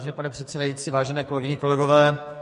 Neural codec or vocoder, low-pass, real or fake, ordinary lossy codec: codec, 44.1 kHz, 2.6 kbps, SNAC; 14.4 kHz; fake; MP3, 48 kbps